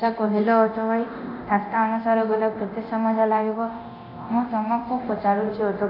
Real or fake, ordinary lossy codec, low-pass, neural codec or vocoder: fake; MP3, 48 kbps; 5.4 kHz; codec, 24 kHz, 0.9 kbps, DualCodec